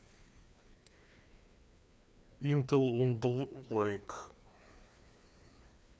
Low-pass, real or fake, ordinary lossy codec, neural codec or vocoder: none; fake; none; codec, 16 kHz, 2 kbps, FreqCodec, larger model